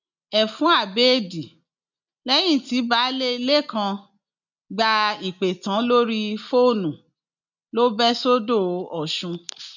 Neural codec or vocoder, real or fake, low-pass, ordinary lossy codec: none; real; 7.2 kHz; none